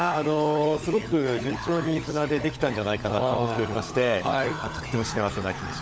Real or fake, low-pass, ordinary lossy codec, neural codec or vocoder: fake; none; none; codec, 16 kHz, 16 kbps, FunCodec, trained on LibriTTS, 50 frames a second